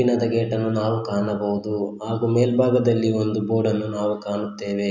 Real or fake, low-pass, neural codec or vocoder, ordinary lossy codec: real; 7.2 kHz; none; none